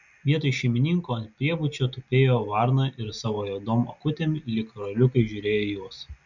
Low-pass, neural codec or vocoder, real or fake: 7.2 kHz; none; real